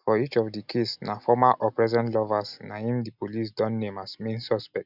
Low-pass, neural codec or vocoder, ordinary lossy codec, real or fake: 5.4 kHz; none; none; real